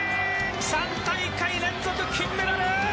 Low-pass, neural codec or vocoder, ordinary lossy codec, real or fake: none; none; none; real